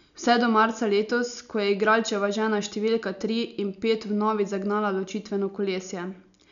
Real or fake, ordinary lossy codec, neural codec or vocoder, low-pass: real; none; none; 7.2 kHz